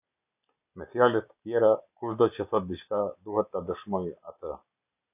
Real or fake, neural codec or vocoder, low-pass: real; none; 3.6 kHz